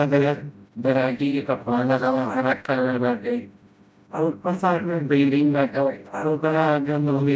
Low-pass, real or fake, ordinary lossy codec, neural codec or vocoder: none; fake; none; codec, 16 kHz, 0.5 kbps, FreqCodec, smaller model